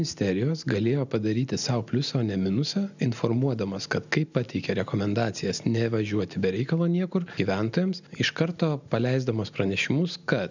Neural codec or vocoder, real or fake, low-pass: none; real; 7.2 kHz